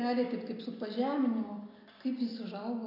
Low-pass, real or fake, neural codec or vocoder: 5.4 kHz; real; none